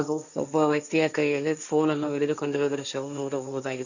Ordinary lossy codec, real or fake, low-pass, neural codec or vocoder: none; fake; 7.2 kHz; codec, 16 kHz, 1.1 kbps, Voila-Tokenizer